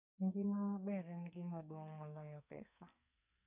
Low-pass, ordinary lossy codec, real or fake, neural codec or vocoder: 3.6 kHz; none; fake; codec, 44.1 kHz, 2.6 kbps, SNAC